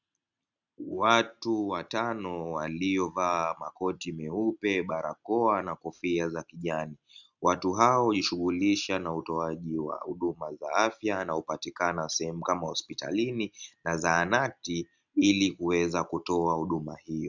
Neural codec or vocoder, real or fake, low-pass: none; real; 7.2 kHz